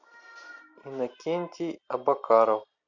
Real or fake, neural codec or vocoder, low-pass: real; none; 7.2 kHz